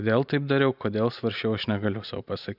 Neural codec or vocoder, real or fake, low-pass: none; real; 5.4 kHz